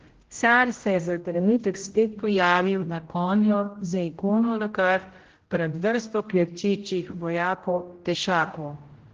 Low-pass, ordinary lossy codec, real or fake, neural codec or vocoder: 7.2 kHz; Opus, 16 kbps; fake; codec, 16 kHz, 0.5 kbps, X-Codec, HuBERT features, trained on general audio